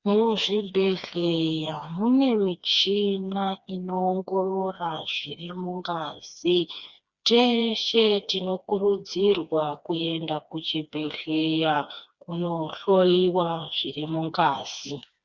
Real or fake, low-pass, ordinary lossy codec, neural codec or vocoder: fake; 7.2 kHz; Opus, 64 kbps; codec, 16 kHz, 2 kbps, FreqCodec, smaller model